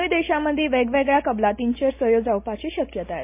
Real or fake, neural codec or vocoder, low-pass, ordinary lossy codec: real; none; 3.6 kHz; MP3, 24 kbps